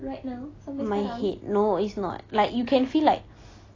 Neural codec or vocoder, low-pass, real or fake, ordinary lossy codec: none; 7.2 kHz; real; AAC, 32 kbps